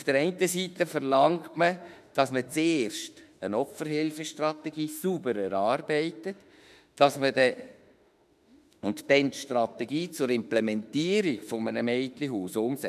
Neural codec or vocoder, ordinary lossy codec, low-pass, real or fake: autoencoder, 48 kHz, 32 numbers a frame, DAC-VAE, trained on Japanese speech; none; 14.4 kHz; fake